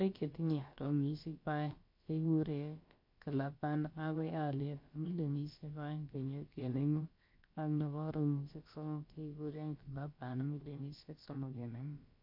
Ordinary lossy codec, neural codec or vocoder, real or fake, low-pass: MP3, 32 kbps; codec, 16 kHz, about 1 kbps, DyCAST, with the encoder's durations; fake; 5.4 kHz